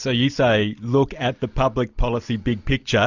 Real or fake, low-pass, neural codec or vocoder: real; 7.2 kHz; none